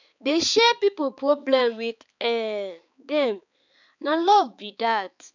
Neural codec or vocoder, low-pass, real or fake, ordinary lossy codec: codec, 16 kHz, 4 kbps, X-Codec, HuBERT features, trained on balanced general audio; 7.2 kHz; fake; none